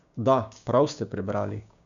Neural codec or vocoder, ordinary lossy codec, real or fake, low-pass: codec, 16 kHz, 6 kbps, DAC; none; fake; 7.2 kHz